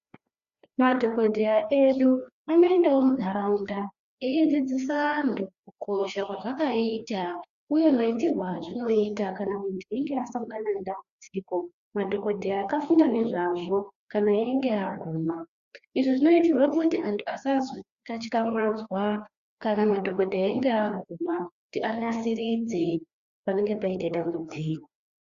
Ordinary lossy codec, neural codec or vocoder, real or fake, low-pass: Opus, 64 kbps; codec, 16 kHz, 2 kbps, FreqCodec, larger model; fake; 7.2 kHz